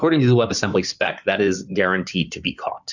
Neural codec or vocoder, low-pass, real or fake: codec, 16 kHz in and 24 kHz out, 2.2 kbps, FireRedTTS-2 codec; 7.2 kHz; fake